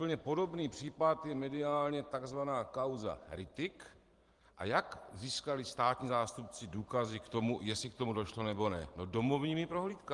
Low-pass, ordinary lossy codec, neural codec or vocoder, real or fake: 10.8 kHz; Opus, 24 kbps; vocoder, 44.1 kHz, 128 mel bands every 512 samples, BigVGAN v2; fake